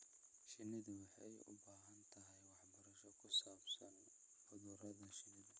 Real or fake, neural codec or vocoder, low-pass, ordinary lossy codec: real; none; none; none